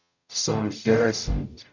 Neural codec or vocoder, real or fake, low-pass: codec, 44.1 kHz, 0.9 kbps, DAC; fake; 7.2 kHz